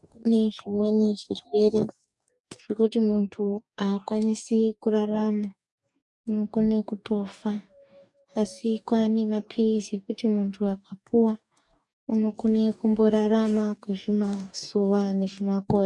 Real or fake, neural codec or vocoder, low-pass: fake; codec, 44.1 kHz, 2.6 kbps, DAC; 10.8 kHz